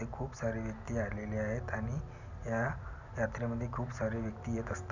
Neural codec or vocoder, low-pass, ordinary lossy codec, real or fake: none; 7.2 kHz; none; real